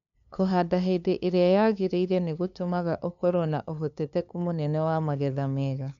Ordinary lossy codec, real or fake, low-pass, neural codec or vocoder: none; fake; 7.2 kHz; codec, 16 kHz, 2 kbps, FunCodec, trained on LibriTTS, 25 frames a second